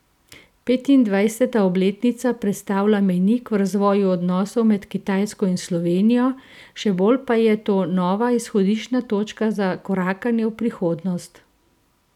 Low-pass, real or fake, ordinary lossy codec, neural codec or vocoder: 19.8 kHz; real; none; none